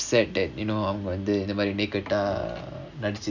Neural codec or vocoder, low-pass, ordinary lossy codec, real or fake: none; 7.2 kHz; none; real